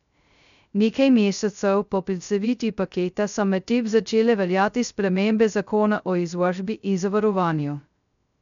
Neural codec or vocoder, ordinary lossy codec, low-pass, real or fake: codec, 16 kHz, 0.2 kbps, FocalCodec; none; 7.2 kHz; fake